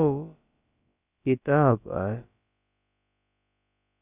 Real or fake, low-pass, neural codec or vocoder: fake; 3.6 kHz; codec, 16 kHz, about 1 kbps, DyCAST, with the encoder's durations